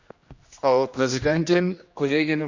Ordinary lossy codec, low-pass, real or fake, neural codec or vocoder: Opus, 64 kbps; 7.2 kHz; fake; codec, 16 kHz, 1 kbps, X-Codec, HuBERT features, trained on general audio